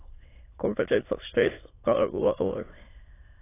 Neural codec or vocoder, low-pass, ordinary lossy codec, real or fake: autoencoder, 22.05 kHz, a latent of 192 numbers a frame, VITS, trained on many speakers; 3.6 kHz; AAC, 16 kbps; fake